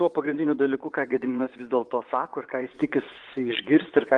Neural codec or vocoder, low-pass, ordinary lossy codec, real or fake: vocoder, 24 kHz, 100 mel bands, Vocos; 10.8 kHz; Opus, 24 kbps; fake